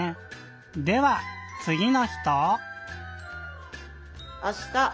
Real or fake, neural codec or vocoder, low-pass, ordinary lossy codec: real; none; none; none